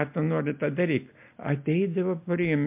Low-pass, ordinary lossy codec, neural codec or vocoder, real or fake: 3.6 kHz; MP3, 32 kbps; none; real